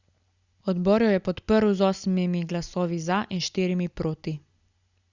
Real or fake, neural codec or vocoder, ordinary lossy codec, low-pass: real; none; Opus, 64 kbps; 7.2 kHz